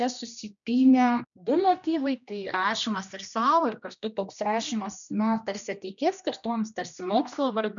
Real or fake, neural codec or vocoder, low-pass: fake; codec, 16 kHz, 1 kbps, X-Codec, HuBERT features, trained on general audio; 7.2 kHz